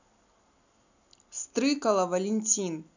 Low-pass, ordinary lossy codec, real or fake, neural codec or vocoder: 7.2 kHz; none; real; none